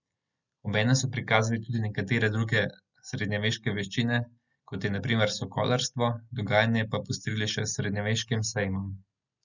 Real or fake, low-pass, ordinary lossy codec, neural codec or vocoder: real; 7.2 kHz; none; none